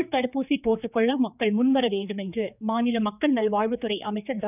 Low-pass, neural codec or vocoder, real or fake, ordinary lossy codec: 3.6 kHz; codec, 16 kHz, 4 kbps, X-Codec, HuBERT features, trained on general audio; fake; none